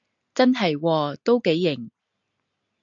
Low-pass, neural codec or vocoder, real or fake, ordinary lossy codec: 7.2 kHz; none; real; AAC, 64 kbps